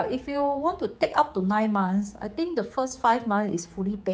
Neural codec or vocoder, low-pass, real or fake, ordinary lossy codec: codec, 16 kHz, 4 kbps, X-Codec, HuBERT features, trained on general audio; none; fake; none